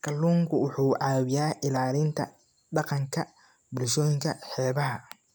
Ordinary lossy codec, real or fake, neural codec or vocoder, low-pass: none; real; none; none